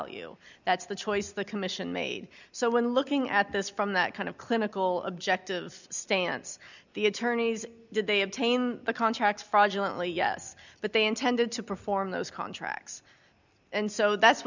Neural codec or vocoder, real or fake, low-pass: none; real; 7.2 kHz